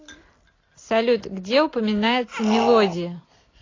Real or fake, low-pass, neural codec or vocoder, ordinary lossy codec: real; 7.2 kHz; none; AAC, 32 kbps